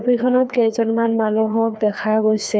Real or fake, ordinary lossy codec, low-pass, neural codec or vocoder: fake; none; none; codec, 16 kHz, 2 kbps, FreqCodec, larger model